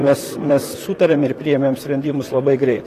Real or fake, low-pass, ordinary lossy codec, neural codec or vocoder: fake; 14.4 kHz; AAC, 48 kbps; vocoder, 44.1 kHz, 128 mel bands, Pupu-Vocoder